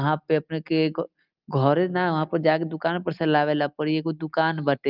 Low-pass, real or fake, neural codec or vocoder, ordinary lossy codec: 5.4 kHz; real; none; Opus, 24 kbps